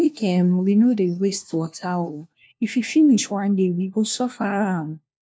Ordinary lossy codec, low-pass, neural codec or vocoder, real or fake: none; none; codec, 16 kHz, 1 kbps, FunCodec, trained on LibriTTS, 50 frames a second; fake